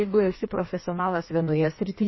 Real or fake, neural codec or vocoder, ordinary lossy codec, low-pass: fake; codec, 16 kHz in and 24 kHz out, 1.1 kbps, FireRedTTS-2 codec; MP3, 24 kbps; 7.2 kHz